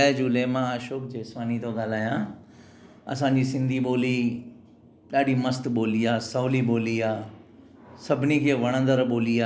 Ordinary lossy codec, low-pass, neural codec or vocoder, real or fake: none; none; none; real